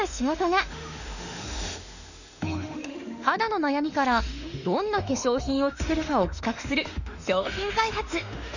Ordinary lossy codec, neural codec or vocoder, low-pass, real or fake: none; autoencoder, 48 kHz, 32 numbers a frame, DAC-VAE, trained on Japanese speech; 7.2 kHz; fake